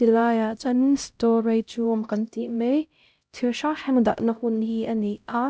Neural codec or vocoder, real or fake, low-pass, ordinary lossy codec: codec, 16 kHz, 0.5 kbps, X-Codec, HuBERT features, trained on LibriSpeech; fake; none; none